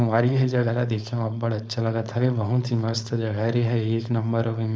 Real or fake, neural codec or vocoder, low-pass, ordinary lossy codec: fake; codec, 16 kHz, 4.8 kbps, FACodec; none; none